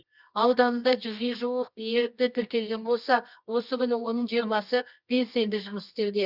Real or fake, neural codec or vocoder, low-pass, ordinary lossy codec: fake; codec, 24 kHz, 0.9 kbps, WavTokenizer, medium music audio release; 5.4 kHz; Opus, 64 kbps